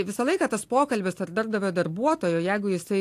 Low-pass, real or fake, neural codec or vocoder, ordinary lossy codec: 14.4 kHz; real; none; AAC, 64 kbps